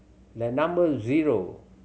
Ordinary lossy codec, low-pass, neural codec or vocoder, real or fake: none; none; none; real